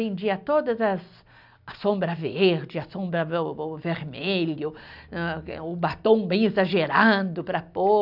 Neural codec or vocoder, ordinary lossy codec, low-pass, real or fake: none; none; 5.4 kHz; real